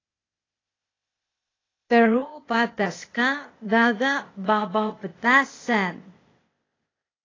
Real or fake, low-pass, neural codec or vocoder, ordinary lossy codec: fake; 7.2 kHz; codec, 16 kHz, 0.8 kbps, ZipCodec; AAC, 32 kbps